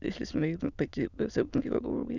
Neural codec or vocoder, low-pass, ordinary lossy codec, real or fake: autoencoder, 22.05 kHz, a latent of 192 numbers a frame, VITS, trained on many speakers; 7.2 kHz; none; fake